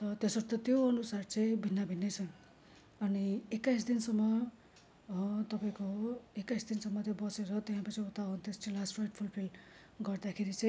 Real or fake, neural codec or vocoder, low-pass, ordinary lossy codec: real; none; none; none